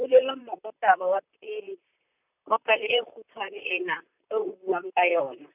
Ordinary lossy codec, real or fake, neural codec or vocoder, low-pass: none; fake; vocoder, 44.1 kHz, 128 mel bands, Pupu-Vocoder; 3.6 kHz